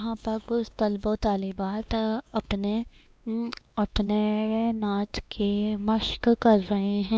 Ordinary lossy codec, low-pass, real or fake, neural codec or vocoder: none; none; fake; codec, 16 kHz, 2 kbps, X-Codec, WavLM features, trained on Multilingual LibriSpeech